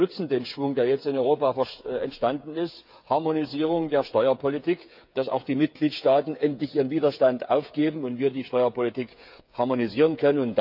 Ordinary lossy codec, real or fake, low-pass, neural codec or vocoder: none; fake; 5.4 kHz; codec, 16 kHz, 8 kbps, FreqCodec, smaller model